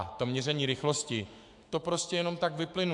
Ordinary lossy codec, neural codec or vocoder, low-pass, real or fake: AAC, 64 kbps; autoencoder, 48 kHz, 128 numbers a frame, DAC-VAE, trained on Japanese speech; 10.8 kHz; fake